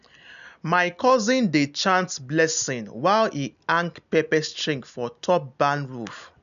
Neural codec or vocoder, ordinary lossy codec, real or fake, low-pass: none; none; real; 7.2 kHz